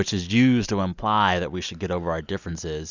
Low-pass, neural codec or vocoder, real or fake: 7.2 kHz; none; real